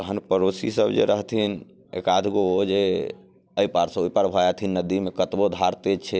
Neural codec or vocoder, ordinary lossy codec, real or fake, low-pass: none; none; real; none